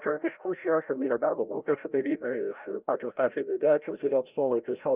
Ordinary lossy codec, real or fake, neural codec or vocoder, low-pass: Opus, 24 kbps; fake; codec, 16 kHz, 0.5 kbps, FreqCodec, larger model; 3.6 kHz